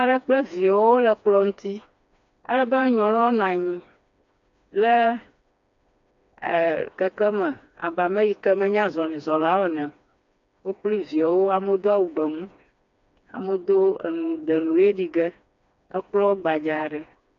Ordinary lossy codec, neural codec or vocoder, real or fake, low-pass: AAC, 64 kbps; codec, 16 kHz, 2 kbps, FreqCodec, smaller model; fake; 7.2 kHz